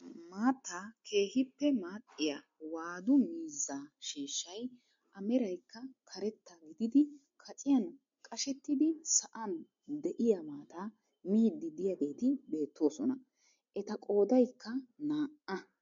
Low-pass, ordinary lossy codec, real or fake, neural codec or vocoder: 7.2 kHz; MP3, 48 kbps; real; none